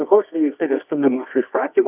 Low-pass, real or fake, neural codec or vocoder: 3.6 kHz; fake; codec, 24 kHz, 0.9 kbps, WavTokenizer, medium music audio release